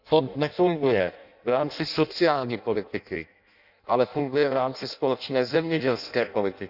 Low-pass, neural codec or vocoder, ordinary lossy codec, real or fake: 5.4 kHz; codec, 16 kHz in and 24 kHz out, 0.6 kbps, FireRedTTS-2 codec; none; fake